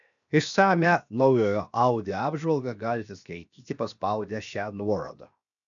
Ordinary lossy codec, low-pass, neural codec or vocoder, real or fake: MP3, 96 kbps; 7.2 kHz; codec, 16 kHz, 0.7 kbps, FocalCodec; fake